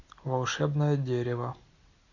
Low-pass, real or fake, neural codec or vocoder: 7.2 kHz; real; none